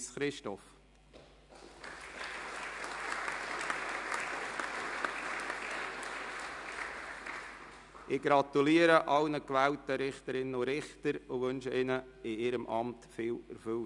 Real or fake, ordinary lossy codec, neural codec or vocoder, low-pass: real; none; none; 10.8 kHz